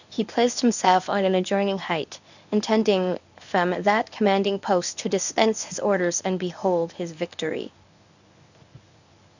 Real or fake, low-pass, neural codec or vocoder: fake; 7.2 kHz; codec, 24 kHz, 0.9 kbps, WavTokenizer, medium speech release version 1